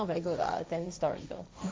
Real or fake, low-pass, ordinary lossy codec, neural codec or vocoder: fake; none; none; codec, 16 kHz, 1.1 kbps, Voila-Tokenizer